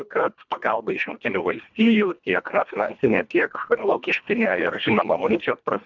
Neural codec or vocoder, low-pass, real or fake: codec, 24 kHz, 1.5 kbps, HILCodec; 7.2 kHz; fake